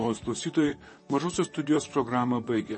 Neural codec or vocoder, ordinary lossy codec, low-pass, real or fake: vocoder, 44.1 kHz, 128 mel bands, Pupu-Vocoder; MP3, 32 kbps; 10.8 kHz; fake